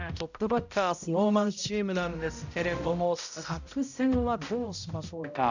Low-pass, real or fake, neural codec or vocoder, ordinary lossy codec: 7.2 kHz; fake; codec, 16 kHz, 0.5 kbps, X-Codec, HuBERT features, trained on balanced general audio; none